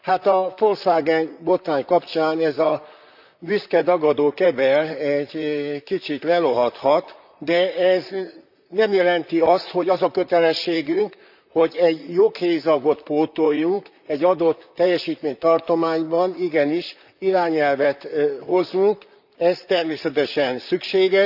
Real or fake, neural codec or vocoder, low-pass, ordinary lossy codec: fake; vocoder, 44.1 kHz, 128 mel bands, Pupu-Vocoder; 5.4 kHz; none